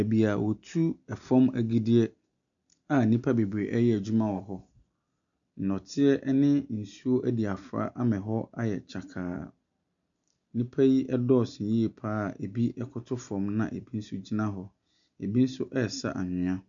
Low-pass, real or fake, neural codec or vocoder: 7.2 kHz; real; none